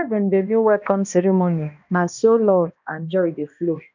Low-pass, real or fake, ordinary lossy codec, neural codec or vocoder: 7.2 kHz; fake; none; codec, 16 kHz, 1 kbps, X-Codec, HuBERT features, trained on balanced general audio